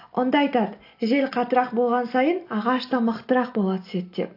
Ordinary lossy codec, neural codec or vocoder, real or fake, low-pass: AAC, 32 kbps; none; real; 5.4 kHz